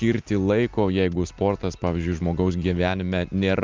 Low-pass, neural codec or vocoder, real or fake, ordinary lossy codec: 7.2 kHz; none; real; Opus, 24 kbps